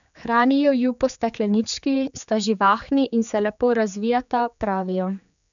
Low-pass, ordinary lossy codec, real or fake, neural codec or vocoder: 7.2 kHz; none; fake; codec, 16 kHz, 4 kbps, X-Codec, HuBERT features, trained on general audio